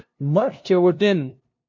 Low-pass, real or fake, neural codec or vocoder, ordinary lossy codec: 7.2 kHz; fake; codec, 16 kHz, 0.5 kbps, FunCodec, trained on LibriTTS, 25 frames a second; MP3, 48 kbps